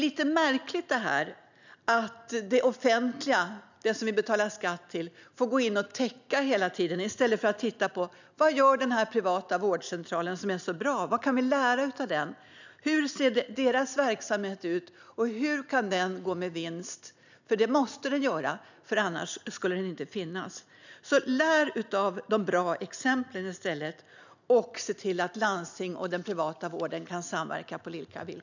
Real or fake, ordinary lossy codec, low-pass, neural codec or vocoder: real; none; 7.2 kHz; none